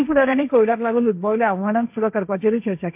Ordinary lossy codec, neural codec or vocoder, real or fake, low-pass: MP3, 32 kbps; codec, 16 kHz, 1.1 kbps, Voila-Tokenizer; fake; 3.6 kHz